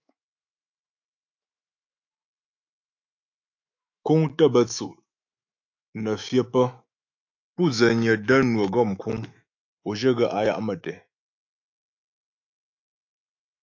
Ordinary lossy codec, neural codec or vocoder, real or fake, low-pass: AAC, 48 kbps; autoencoder, 48 kHz, 128 numbers a frame, DAC-VAE, trained on Japanese speech; fake; 7.2 kHz